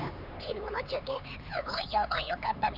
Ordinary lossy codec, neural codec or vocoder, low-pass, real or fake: none; codec, 16 kHz, 4 kbps, X-Codec, HuBERT features, trained on LibriSpeech; 5.4 kHz; fake